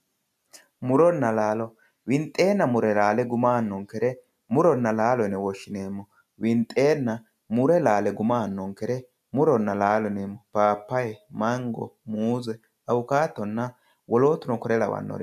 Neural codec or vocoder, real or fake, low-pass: none; real; 14.4 kHz